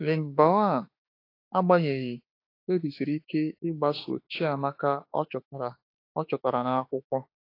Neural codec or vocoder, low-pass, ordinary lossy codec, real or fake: autoencoder, 48 kHz, 32 numbers a frame, DAC-VAE, trained on Japanese speech; 5.4 kHz; AAC, 32 kbps; fake